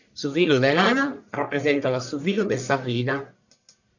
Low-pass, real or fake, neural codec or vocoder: 7.2 kHz; fake; codec, 44.1 kHz, 1.7 kbps, Pupu-Codec